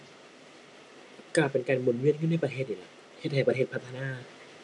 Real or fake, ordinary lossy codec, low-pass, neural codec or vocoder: real; none; 10.8 kHz; none